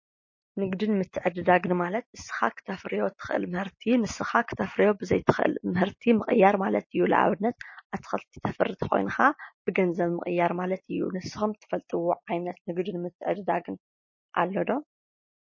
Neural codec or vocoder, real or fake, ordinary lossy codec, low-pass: none; real; MP3, 32 kbps; 7.2 kHz